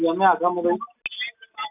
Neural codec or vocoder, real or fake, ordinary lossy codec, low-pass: none; real; none; 3.6 kHz